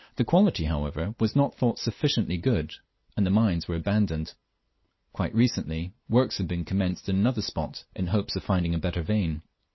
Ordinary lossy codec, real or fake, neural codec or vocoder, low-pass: MP3, 24 kbps; real; none; 7.2 kHz